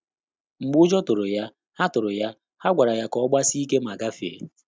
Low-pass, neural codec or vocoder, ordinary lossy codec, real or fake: none; none; none; real